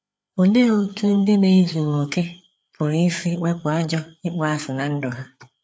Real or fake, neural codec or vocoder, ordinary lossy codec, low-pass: fake; codec, 16 kHz, 4 kbps, FreqCodec, larger model; none; none